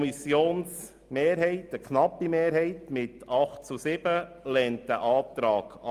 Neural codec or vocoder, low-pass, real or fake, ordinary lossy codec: none; 14.4 kHz; real; Opus, 32 kbps